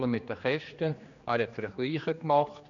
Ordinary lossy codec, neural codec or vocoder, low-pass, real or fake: none; codec, 16 kHz, 2 kbps, X-Codec, HuBERT features, trained on general audio; 7.2 kHz; fake